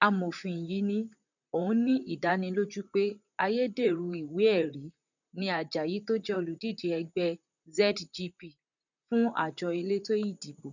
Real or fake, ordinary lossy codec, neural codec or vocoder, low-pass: fake; none; vocoder, 44.1 kHz, 128 mel bands, Pupu-Vocoder; 7.2 kHz